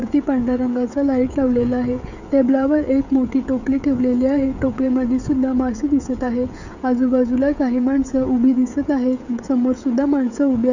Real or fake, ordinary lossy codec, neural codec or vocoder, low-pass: fake; none; codec, 16 kHz, 16 kbps, FreqCodec, smaller model; 7.2 kHz